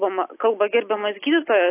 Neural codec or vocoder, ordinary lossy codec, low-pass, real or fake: none; AAC, 16 kbps; 3.6 kHz; real